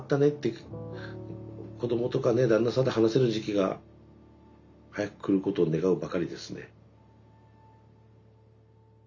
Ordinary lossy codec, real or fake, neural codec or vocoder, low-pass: none; real; none; 7.2 kHz